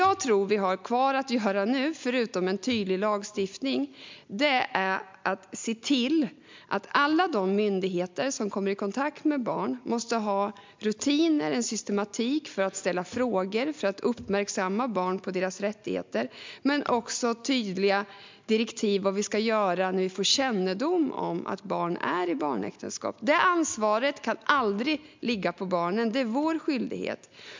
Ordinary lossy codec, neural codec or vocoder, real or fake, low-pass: MP3, 64 kbps; none; real; 7.2 kHz